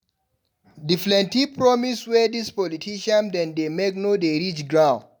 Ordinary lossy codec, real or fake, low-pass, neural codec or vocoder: none; real; none; none